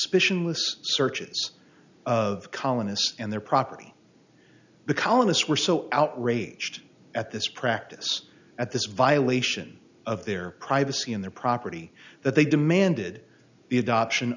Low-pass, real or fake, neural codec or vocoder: 7.2 kHz; real; none